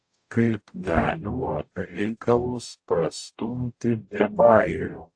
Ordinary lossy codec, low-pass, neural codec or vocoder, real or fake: AAC, 48 kbps; 9.9 kHz; codec, 44.1 kHz, 0.9 kbps, DAC; fake